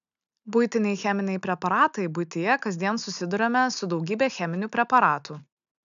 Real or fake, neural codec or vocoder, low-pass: real; none; 7.2 kHz